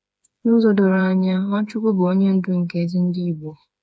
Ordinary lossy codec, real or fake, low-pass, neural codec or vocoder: none; fake; none; codec, 16 kHz, 4 kbps, FreqCodec, smaller model